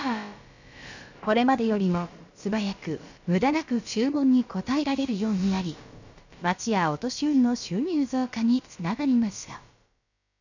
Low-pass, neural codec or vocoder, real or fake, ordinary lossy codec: 7.2 kHz; codec, 16 kHz, about 1 kbps, DyCAST, with the encoder's durations; fake; none